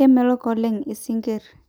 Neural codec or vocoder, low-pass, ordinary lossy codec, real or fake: none; none; none; real